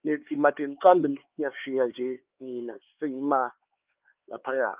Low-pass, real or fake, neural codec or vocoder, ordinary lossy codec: 3.6 kHz; fake; codec, 16 kHz, 4 kbps, X-Codec, HuBERT features, trained on LibriSpeech; Opus, 32 kbps